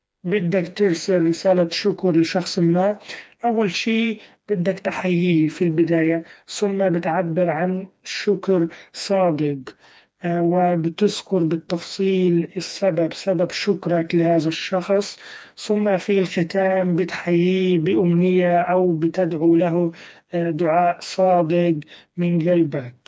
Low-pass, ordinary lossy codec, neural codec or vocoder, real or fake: none; none; codec, 16 kHz, 2 kbps, FreqCodec, smaller model; fake